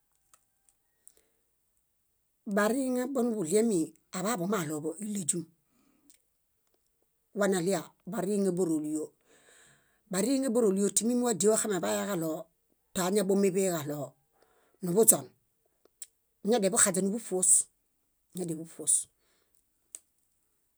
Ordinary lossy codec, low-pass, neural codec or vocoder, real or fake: none; none; none; real